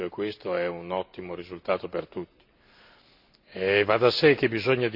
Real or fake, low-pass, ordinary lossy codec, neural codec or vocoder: real; 5.4 kHz; none; none